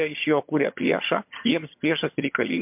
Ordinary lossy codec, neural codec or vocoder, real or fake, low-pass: MP3, 32 kbps; vocoder, 22.05 kHz, 80 mel bands, HiFi-GAN; fake; 3.6 kHz